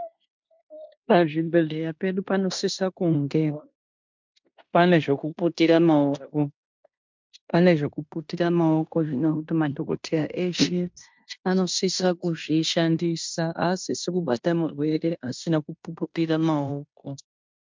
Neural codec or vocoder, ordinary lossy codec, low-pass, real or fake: codec, 16 kHz in and 24 kHz out, 0.9 kbps, LongCat-Audio-Codec, fine tuned four codebook decoder; MP3, 64 kbps; 7.2 kHz; fake